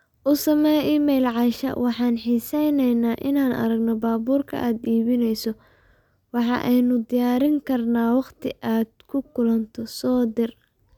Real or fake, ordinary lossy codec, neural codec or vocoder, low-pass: real; none; none; 19.8 kHz